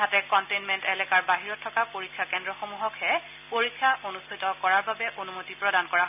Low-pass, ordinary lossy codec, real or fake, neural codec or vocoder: 3.6 kHz; none; real; none